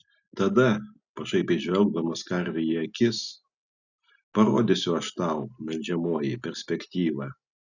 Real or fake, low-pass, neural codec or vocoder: real; 7.2 kHz; none